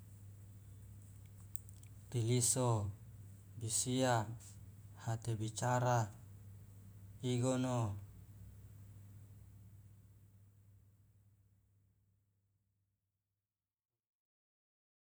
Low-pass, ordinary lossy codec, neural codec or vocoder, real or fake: none; none; none; real